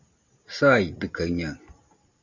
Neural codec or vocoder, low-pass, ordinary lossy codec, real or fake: none; 7.2 kHz; Opus, 64 kbps; real